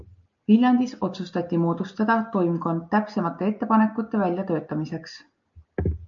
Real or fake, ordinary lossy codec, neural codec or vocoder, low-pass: real; MP3, 64 kbps; none; 7.2 kHz